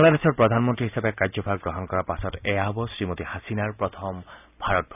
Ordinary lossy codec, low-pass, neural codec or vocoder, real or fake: none; 3.6 kHz; none; real